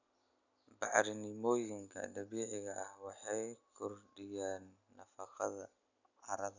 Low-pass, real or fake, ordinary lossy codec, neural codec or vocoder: 7.2 kHz; real; none; none